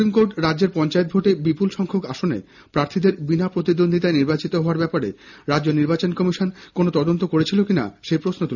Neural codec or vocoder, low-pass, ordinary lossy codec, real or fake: none; 7.2 kHz; none; real